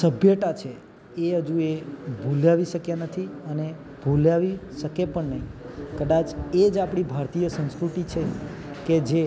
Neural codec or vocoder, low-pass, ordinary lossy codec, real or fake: none; none; none; real